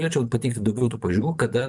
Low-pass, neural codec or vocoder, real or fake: 10.8 kHz; vocoder, 24 kHz, 100 mel bands, Vocos; fake